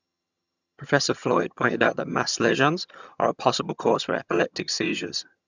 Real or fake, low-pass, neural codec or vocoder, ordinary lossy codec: fake; 7.2 kHz; vocoder, 22.05 kHz, 80 mel bands, HiFi-GAN; none